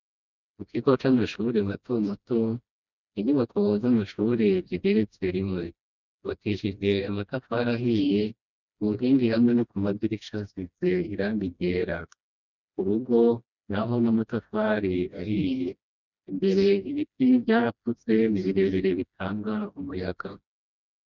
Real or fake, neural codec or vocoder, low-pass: fake; codec, 16 kHz, 1 kbps, FreqCodec, smaller model; 7.2 kHz